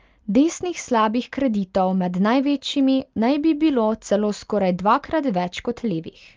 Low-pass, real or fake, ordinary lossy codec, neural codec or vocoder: 7.2 kHz; real; Opus, 32 kbps; none